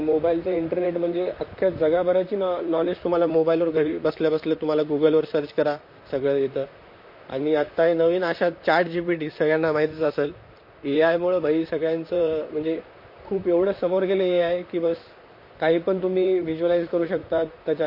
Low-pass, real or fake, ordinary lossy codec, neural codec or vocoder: 5.4 kHz; fake; MP3, 32 kbps; vocoder, 44.1 kHz, 128 mel bands, Pupu-Vocoder